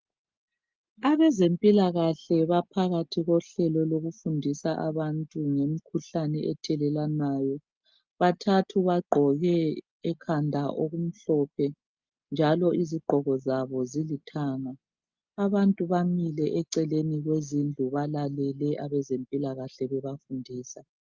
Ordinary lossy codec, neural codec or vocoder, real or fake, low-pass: Opus, 24 kbps; none; real; 7.2 kHz